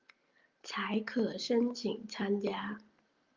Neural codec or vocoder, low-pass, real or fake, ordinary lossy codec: none; 7.2 kHz; real; Opus, 24 kbps